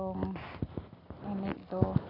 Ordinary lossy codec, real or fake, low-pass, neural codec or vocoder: AAC, 24 kbps; real; 5.4 kHz; none